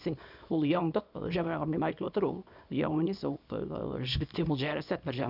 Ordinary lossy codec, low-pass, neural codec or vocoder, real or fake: none; 5.4 kHz; codec, 24 kHz, 0.9 kbps, WavTokenizer, small release; fake